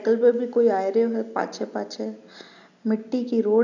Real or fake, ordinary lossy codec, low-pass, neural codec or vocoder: real; AAC, 48 kbps; 7.2 kHz; none